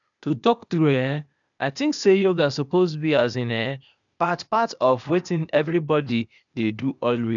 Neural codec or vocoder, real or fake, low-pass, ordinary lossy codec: codec, 16 kHz, 0.8 kbps, ZipCodec; fake; 7.2 kHz; none